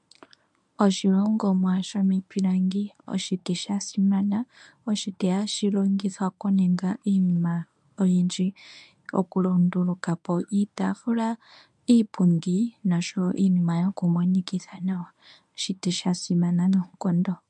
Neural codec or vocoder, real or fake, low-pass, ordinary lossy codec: codec, 24 kHz, 0.9 kbps, WavTokenizer, medium speech release version 1; fake; 10.8 kHz; MP3, 96 kbps